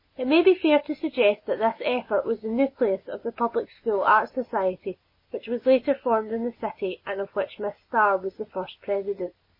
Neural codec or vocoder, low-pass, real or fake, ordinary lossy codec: none; 5.4 kHz; real; MP3, 24 kbps